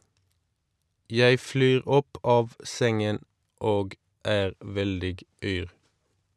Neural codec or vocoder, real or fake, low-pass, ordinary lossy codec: none; real; none; none